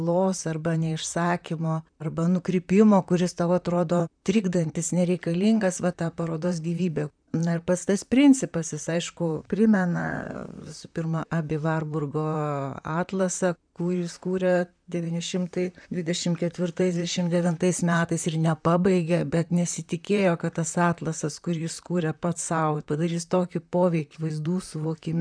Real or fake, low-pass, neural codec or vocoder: fake; 9.9 kHz; vocoder, 44.1 kHz, 128 mel bands, Pupu-Vocoder